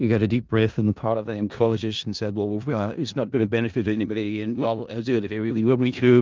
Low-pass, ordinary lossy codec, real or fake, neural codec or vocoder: 7.2 kHz; Opus, 24 kbps; fake; codec, 16 kHz in and 24 kHz out, 0.4 kbps, LongCat-Audio-Codec, four codebook decoder